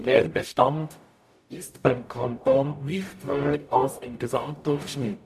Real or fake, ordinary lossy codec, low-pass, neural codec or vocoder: fake; MP3, 64 kbps; 14.4 kHz; codec, 44.1 kHz, 0.9 kbps, DAC